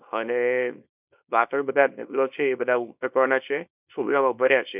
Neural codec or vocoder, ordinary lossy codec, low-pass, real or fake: codec, 24 kHz, 0.9 kbps, WavTokenizer, small release; none; 3.6 kHz; fake